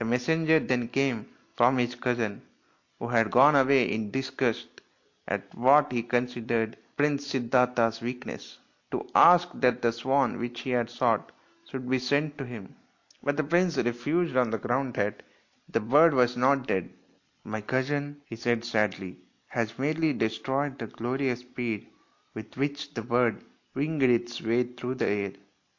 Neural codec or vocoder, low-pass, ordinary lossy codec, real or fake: none; 7.2 kHz; AAC, 48 kbps; real